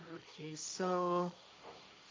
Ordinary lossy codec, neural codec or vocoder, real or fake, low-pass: none; codec, 16 kHz, 1.1 kbps, Voila-Tokenizer; fake; none